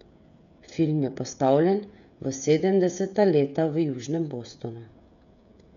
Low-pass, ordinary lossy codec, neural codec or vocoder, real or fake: 7.2 kHz; MP3, 96 kbps; codec, 16 kHz, 16 kbps, FreqCodec, smaller model; fake